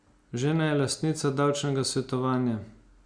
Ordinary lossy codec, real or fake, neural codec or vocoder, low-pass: Opus, 64 kbps; real; none; 9.9 kHz